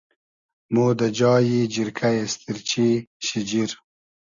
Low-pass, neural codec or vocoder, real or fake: 7.2 kHz; none; real